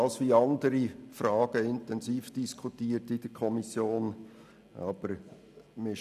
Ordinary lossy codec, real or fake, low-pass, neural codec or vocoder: none; real; 14.4 kHz; none